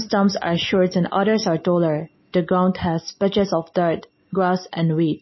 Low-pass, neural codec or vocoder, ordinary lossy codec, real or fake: 7.2 kHz; none; MP3, 24 kbps; real